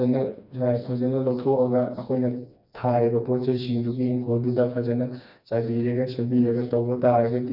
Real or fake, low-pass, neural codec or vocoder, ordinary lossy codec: fake; 5.4 kHz; codec, 16 kHz, 2 kbps, FreqCodec, smaller model; none